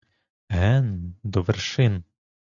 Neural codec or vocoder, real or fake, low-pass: none; real; 7.2 kHz